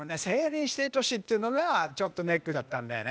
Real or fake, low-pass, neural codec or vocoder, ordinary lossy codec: fake; none; codec, 16 kHz, 0.8 kbps, ZipCodec; none